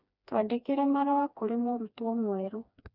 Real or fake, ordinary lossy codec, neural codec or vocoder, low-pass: fake; none; codec, 16 kHz, 2 kbps, FreqCodec, smaller model; 5.4 kHz